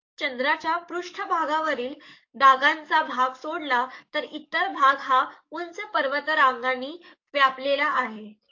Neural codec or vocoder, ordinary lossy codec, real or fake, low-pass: codec, 44.1 kHz, 7.8 kbps, DAC; AAC, 48 kbps; fake; 7.2 kHz